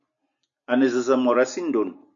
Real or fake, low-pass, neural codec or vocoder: real; 7.2 kHz; none